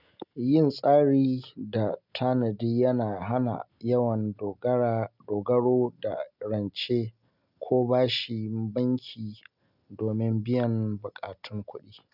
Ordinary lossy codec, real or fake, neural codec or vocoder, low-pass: none; real; none; 5.4 kHz